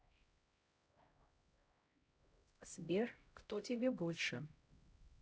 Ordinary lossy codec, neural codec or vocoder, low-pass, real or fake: none; codec, 16 kHz, 0.5 kbps, X-Codec, HuBERT features, trained on LibriSpeech; none; fake